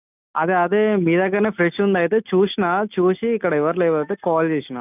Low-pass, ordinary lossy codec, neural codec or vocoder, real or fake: 3.6 kHz; none; none; real